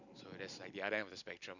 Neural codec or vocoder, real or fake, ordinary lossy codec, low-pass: none; real; none; 7.2 kHz